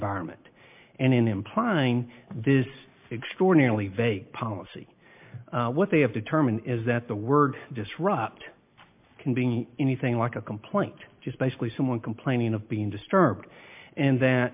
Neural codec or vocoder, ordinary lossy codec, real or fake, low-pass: none; MP3, 32 kbps; real; 3.6 kHz